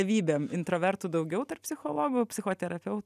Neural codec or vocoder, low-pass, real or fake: none; 14.4 kHz; real